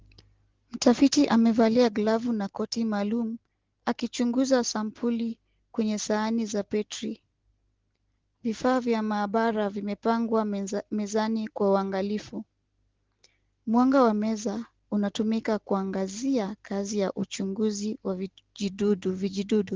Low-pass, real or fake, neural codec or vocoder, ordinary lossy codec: 7.2 kHz; real; none; Opus, 16 kbps